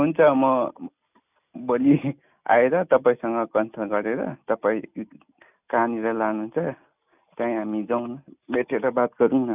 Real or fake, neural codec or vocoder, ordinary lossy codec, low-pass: real; none; none; 3.6 kHz